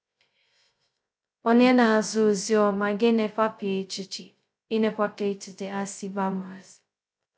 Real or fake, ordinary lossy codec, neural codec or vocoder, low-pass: fake; none; codec, 16 kHz, 0.2 kbps, FocalCodec; none